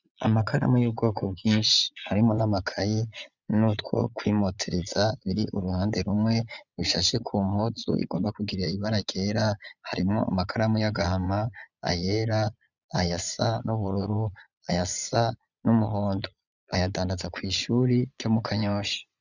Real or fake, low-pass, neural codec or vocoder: fake; 7.2 kHz; vocoder, 22.05 kHz, 80 mel bands, Vocos